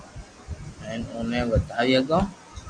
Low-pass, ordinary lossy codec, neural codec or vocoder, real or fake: 9.9 kHz; AAC, 64 kbps; none; real